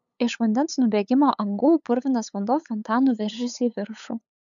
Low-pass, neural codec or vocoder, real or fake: 7.2 kHz; codec, 16 kHz, 8 kbps, FunCodec, trained on LibriTTS, 25 frames a second; fake